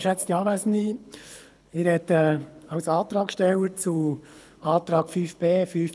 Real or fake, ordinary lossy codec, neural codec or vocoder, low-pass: fake; none; codec, 24 kHz, 6 kbps, HILCodec; none